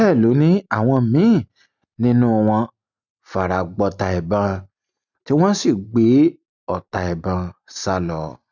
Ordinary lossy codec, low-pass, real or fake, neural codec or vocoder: none; 7.2 kHz; real; none